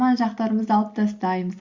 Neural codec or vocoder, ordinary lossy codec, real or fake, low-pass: none; Opus, 64 kbps; real; 7.2 kHz